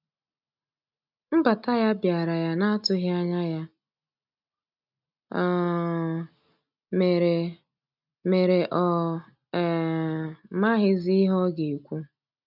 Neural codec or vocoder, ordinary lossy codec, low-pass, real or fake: none; none; 5.4 kHz; real